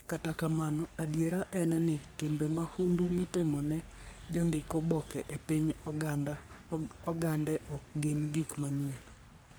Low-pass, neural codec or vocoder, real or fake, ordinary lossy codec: none; codec, 44.1 kHz, 3.4 kbps, Pupu-Codec; fake; none